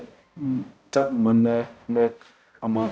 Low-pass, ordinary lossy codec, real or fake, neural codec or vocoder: none; none; fake; codec, 16 kHz, 0.5 kbps, X-Codec, HuBERT features, trained on balanced general audio